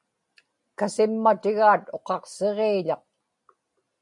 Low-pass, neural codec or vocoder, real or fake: 10.8 kHz; none; real